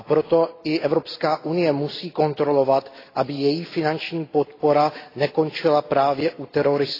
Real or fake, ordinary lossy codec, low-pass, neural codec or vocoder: real; AAC, 24 kbps; 5.4 kHz; none